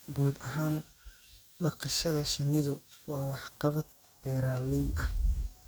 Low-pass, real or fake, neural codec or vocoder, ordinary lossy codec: none; fake; codec, 44.1 kHz, 2.6 kbps, DAC; none